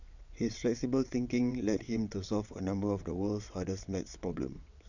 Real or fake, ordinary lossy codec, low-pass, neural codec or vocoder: fake; none; 7.2 kHz; vocoder, 22.05 kHz, 80 mel bands, WaveNeXt